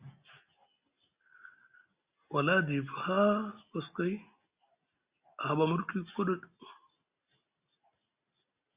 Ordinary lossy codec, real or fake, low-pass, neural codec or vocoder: Opus, 64 kbps; real; 3.6 kHz; none